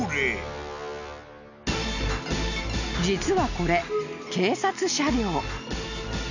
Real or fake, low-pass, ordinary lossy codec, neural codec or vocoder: real; 7.2 kHz; none; none